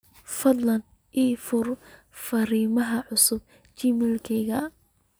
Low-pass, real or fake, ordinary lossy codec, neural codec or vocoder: none; real; none; none